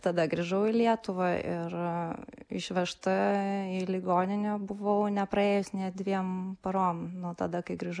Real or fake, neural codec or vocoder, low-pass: real; none; 9.9 kHz